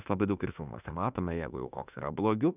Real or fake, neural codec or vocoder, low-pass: fake; autoencoder, 48 kHz, 32 numbers a frame, DAC-VAE, trained on Japanese speech; 3.6 kHz